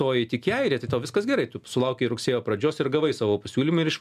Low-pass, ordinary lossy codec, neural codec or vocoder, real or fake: 14.4 kHz; MP3, 96 kbps; none; real